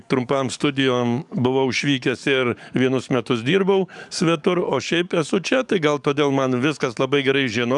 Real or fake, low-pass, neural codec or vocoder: fake; 10.8 kHz; codec, 44.1 kHz, 7.8 kbps, DAC